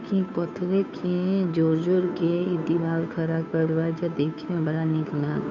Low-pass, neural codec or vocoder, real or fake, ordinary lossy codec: 7.2 kHz; codec, 16 kHz, 2 kbps, FunCodec, trained on Chinese and English, 25 frames a second; fake; none